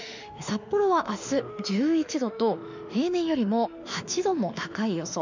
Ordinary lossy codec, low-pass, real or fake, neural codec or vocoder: none; 7.2 kHz; fake; autoencoder, 48 kHz, 32 numbers a frame, DAC-VAE, trained on Japanese speech